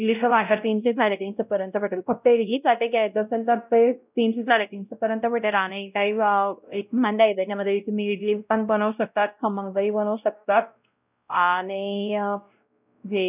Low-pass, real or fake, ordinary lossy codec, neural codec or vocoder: 3.6 kHz; fake; none; codec, 16 kHz, 0.5 kbps, X-Codec, WavLM features, trained on Multilingual LibriSpeech